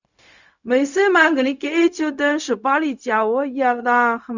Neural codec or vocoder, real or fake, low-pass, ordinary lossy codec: codec, 16 kHz, 0.4 kbps, LongCat-Audio-Codec; fake; 7.2 kHz; none